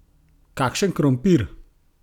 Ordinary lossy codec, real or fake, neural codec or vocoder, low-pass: none; real; none; 19.8 kHz